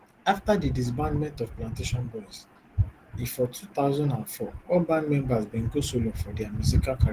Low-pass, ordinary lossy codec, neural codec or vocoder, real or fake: 14.4 kHz; Opus, 16 kbps; none; real